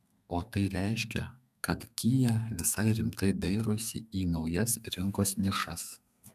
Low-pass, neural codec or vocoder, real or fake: 14.4 kHz; codec, 32 kHz, 1.9 kbps, SNAC; fake